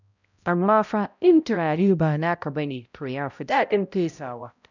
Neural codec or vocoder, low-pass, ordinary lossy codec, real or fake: codec, 16 kHz, 0.5 kbps, X-Codec, HuBERT features, trained on balanced general audio; 7.2 kHz; none; fake